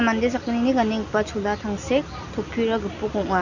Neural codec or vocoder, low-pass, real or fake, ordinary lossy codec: none; 7.2 kHz; real; none